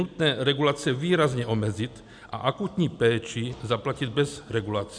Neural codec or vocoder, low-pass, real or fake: none; 9.9 kHz; real